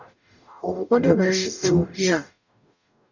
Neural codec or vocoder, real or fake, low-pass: codec, 44.1 kHz, 0.9 kbps, DAC; fake; 7.2 kHz